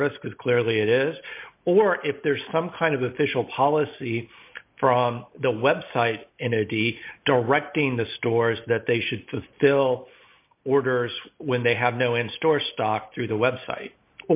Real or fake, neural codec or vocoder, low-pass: real; none; 3.6 kHz